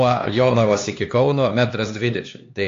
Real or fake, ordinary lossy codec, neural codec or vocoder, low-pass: fake; AAC, 48 kbps; codec, 16 kHz, 2 kbps, X-Codec, HuBERT features, trained on LibriSpeech; 7.2 kHz